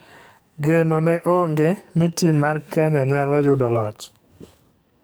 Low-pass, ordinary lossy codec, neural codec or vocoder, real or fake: none; none; codec, 44.1 kHz, 2.6 kbps, SNAC; fake